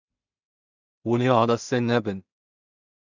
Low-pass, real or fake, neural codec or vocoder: 7.2 kHz; fake; codec, 16 kHz in and 24 kHz out, 0.4 kbps, LongCat-Audio-Codec, two codebook decoder